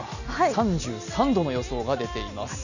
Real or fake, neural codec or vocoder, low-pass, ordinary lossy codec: real; none; 7.2 kHz; none